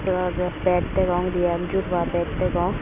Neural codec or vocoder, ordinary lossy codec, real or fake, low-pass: none; MP3, 24 kbps; real; 3.6 kHz